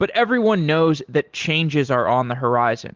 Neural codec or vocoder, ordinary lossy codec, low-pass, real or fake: none; Opus, 16 kbps; 7.2 kHz; real